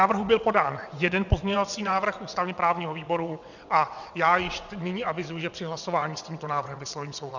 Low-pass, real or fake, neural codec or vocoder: 7.2 kHz; fake; vocoder, 22.05 kHz, 80 mel bands, WaveNeXt